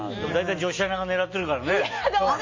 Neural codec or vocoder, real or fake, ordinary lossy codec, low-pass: none; real; MP3, 32 kbps; 7.2 kHz